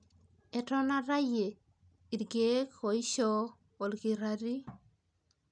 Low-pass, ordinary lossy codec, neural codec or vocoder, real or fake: 9.9 kHz; none; none; real